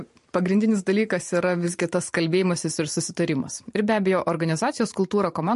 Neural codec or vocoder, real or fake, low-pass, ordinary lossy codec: vocoder, 44.1 kHz, 128 mel bands every 512 samples, BigVGAN v2; fake; 14.4 kHz; MP3, 48 kbps